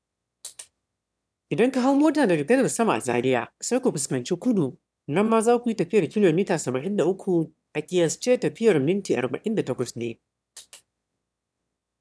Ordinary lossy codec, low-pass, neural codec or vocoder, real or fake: none; none; autoencoder, 22.05 kHz, a latent of 192 numbers a frame, VITS, trained on one speaker; fake